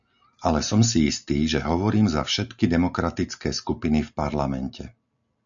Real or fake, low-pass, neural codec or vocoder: real; 7.2 kHz; none